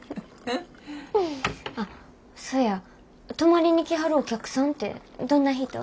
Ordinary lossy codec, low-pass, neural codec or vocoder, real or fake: none; none; none; real